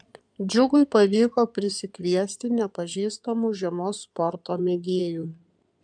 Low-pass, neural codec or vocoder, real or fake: 9.9 kHz; codec, 16 kHz in and 24 kHz out, 2.2 kbps, FireRedTTS-2 codec; fake